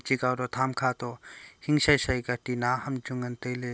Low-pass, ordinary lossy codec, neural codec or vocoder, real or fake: none; none; none; real